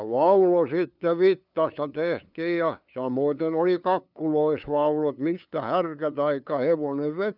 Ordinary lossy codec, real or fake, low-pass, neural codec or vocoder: none; fake; 5.4 kHz; codec, 16 kHz, 2 kbps, FunCodec, trained on LibriTTS, 25 frames a second